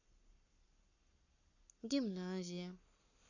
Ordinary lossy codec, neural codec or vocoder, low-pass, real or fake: none; codec, 44.1 kHz, 7.8 kbps, Pupu-Codec; 7.2 kHz; fake